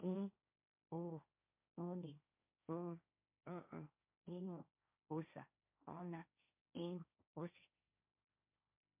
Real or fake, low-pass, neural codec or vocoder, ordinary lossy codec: fake; 3.6 kHz; codec, 16 kHz, 1.1 kbps, Voila-Tokenizer; MP3, 32 kbps